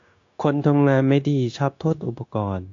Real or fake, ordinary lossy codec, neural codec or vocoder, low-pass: fake; AAC, 48 kbps; codec, 16 kHz, 0.9 kbps, LongCat-Audio-Codec; 7.2 kHz